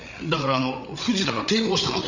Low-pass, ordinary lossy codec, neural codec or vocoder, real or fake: 7.2 kHz; none; codec, 16 kHz, 8 kbps, FreqCodec, larger model; fake